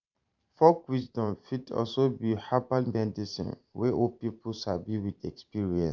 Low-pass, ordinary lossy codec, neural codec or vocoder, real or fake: 7.2 kHz; none; vocoder, 44.1 kHz, 128 mel bands every 512 samples, BigVGAN v2; fake